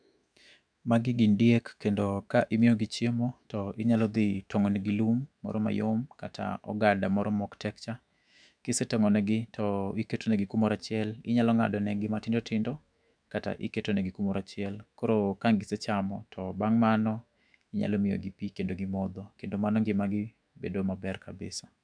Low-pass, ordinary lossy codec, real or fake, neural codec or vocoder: 9.9 kHz; none; fake; autoencoder, 48 kHz, 128 numbers a frame, DAC-VAE, trained on Japanese speech